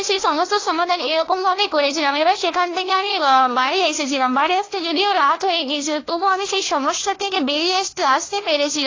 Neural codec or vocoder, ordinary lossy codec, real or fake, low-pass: codec, 16 kHz, 1 kbps, FunCodec, trained on LibriTTS, 50 frames a second; AAC, 32 kbps; fake; 7.2 kHz